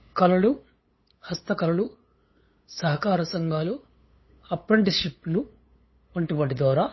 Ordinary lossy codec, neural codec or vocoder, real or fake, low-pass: MP3, 24 kbps; codec, 16 kHz, 8 kbps, FunCodec, trained on LibriTTS, 25 frames a second; fake; 7.2 kHz